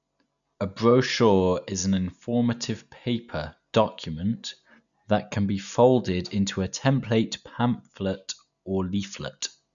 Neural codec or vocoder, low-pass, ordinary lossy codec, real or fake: none; 7.2 kHz; none; real